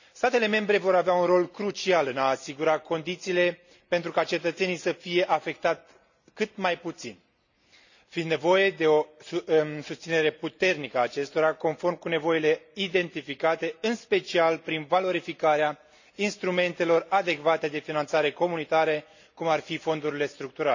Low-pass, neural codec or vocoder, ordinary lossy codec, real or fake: 7.2 kHz; none; none; real